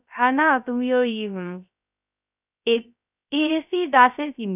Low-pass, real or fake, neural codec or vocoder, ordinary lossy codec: 3.6 kHz; fake; codec, 16 kHz, 0.3 kbps, FocalCodec; none